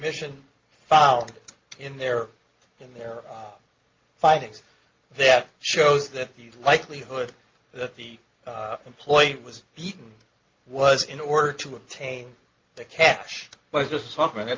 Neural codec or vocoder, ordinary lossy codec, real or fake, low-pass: none; Opus, 32 kbps; real; 7.2 kHz